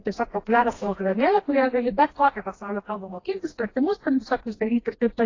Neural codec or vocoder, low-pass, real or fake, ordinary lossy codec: codec, 16 kHz, 1 kbps, FreqCodec, smaller model; 7.2 kHz; fake; AAC, 32 kbps